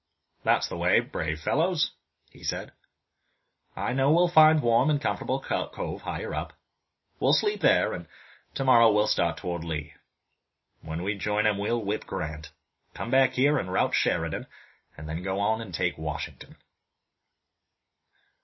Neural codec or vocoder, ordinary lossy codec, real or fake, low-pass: none; MP3, 24 kbps; real; 7.2 kHz